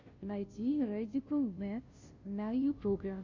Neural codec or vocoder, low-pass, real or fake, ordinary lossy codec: codec, 16 kHz, 0.5 kbps, FunCodec, trained on Chinese and English, 25 frames a second; 7.2 kHz; fake; Opus, 64 kbps